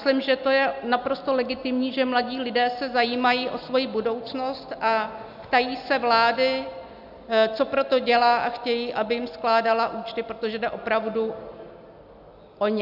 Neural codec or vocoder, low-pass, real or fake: none; 5.4 kHz; real